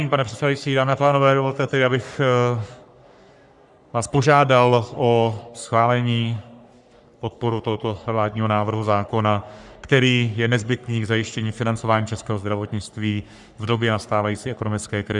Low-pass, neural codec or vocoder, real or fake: 10.8 kHz; codec, 44.1 kHz, 3.4 kbps, Pupu-Codec; fake